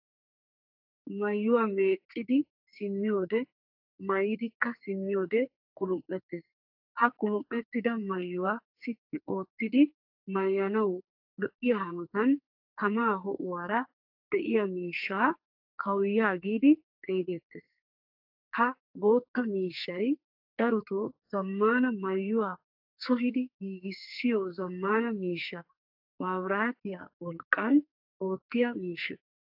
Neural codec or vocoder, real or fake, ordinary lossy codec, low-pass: codec, 44.1 kHz, 2.6 kbps, SNAC; fake; AAC, 48 kbps; 5.4 kHz